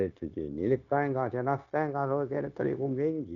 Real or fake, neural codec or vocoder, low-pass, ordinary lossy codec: fake; codec, 16 kHz, 0.9 kbps, LongCat-Audio-Codec; 7.2 kHz; none